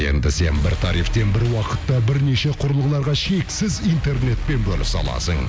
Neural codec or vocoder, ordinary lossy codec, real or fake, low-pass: none; none; real; none